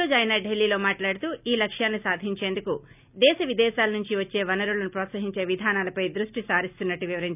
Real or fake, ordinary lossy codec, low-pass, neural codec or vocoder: real; none; 3.6 kHz; none